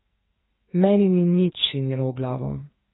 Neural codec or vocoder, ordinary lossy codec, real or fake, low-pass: codec, 44.1 kHz, 2.6 kbps, SNAC; AAC, 16 kbps; fake; 7.2 kHz